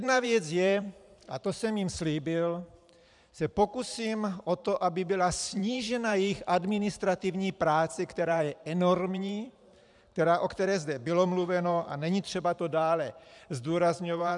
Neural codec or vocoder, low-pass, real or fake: vocoder, 44.1 kHz, 128 mel bands every 512 samples, BigVGAN v2; 10.8 kHz; fake